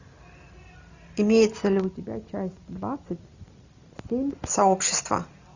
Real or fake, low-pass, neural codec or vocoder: real; 7.2 kHz; none